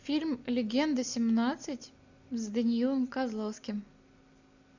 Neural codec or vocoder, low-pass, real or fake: none; 7.2 kHz; real